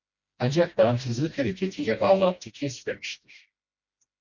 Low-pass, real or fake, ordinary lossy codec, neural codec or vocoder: 7.2 kHz; fake; Opus, 64 kbps; codec, 16 kHz, 1 kbps, FreqCodec, smaller model